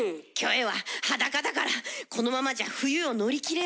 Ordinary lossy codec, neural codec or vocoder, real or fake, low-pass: none; none; real; none